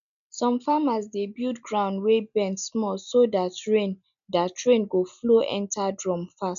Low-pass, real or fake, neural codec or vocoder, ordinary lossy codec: 7.2 kHz; real; none; none